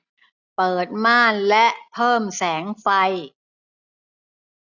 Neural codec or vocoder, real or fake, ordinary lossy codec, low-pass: none; real; none; 7.2 kHz